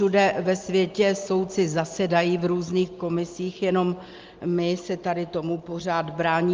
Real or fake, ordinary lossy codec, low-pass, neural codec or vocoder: real; Opus, 32 kbps; 7.2 kHz; none